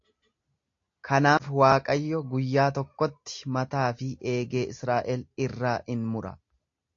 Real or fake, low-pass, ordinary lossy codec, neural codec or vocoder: real; 7.2 kHz; AAC, 48 kbps; none